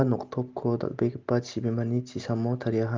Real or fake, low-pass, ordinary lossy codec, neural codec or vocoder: real; 7.2 kHz; Opus, 32 kbps; none